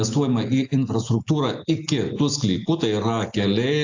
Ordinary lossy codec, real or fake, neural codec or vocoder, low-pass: AAC, 48 kbps; real; none; 7.2 kHz